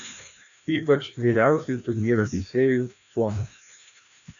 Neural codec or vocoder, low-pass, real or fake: codec, 16 kHz, 1 kbps, FreqCodec, larger model; 7.2 kHz; fake